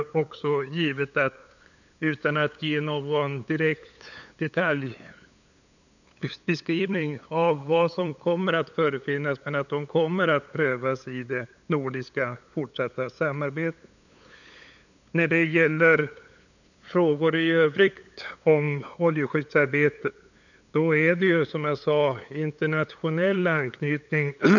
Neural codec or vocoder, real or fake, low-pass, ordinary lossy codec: codec, 16 kHz, 8 kbps, FunCodec, trained on LibriTTS, 25 frames a second; fake; 7.2 kHz; none